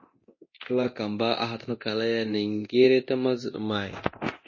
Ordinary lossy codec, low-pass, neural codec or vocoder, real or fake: MP3, 32 kbps; 7.2 kHz; codec, 24 kHz, 0.9 kbps, DualCodec; fake